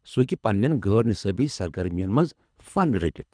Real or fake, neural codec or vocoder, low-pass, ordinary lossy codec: fake; codec, 24 kHz, 3 kbps, HILCodec; 9.9 kHz; none